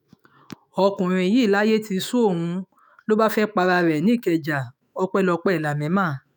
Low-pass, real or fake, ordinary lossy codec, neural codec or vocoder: none; fake; none; autoencoder, 48 kHz, 128 numbers a frame, DAC-VAE, trained on Japanese speech